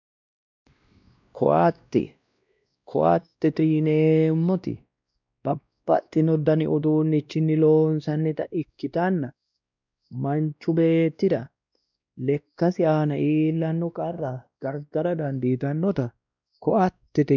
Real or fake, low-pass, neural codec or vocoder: fake; 7.2 kHz; codec, 16 kHz, 1 kbps, X-Codec, WavLM features, trained on Multilingual LibriSpeech